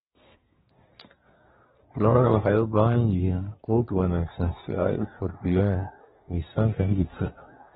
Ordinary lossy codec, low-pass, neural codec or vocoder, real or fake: AAC, 16 kbps; 10.8 kHz; codec, 24 kHz, 1 kbps, SNAC; fake